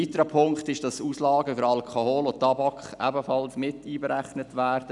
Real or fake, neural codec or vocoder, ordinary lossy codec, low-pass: real; none; none; 10.8 kHz